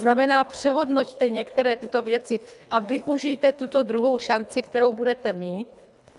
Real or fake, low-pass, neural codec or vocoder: fake; 10.8 kHz; codec, 24 kHz, 1.5 kbps, HILCodec